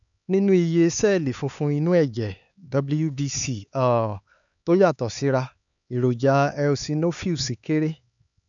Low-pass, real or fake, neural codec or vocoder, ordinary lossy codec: 7.2 kHz; fake; codec, 16 kHz, 4 kbps, X-Codec, HuBERT features, trained on LibriSpeech; none